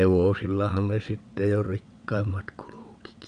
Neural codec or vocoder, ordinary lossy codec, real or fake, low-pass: vocoder, 22.05 kHz, 80 mel bands, WaveNeXt; none; fake; 9.9 kHz